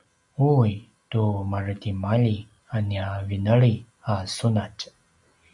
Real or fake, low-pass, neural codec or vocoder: real; 10.8 kHz; none